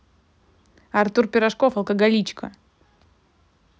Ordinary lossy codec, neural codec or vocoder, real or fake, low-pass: none; none; real; none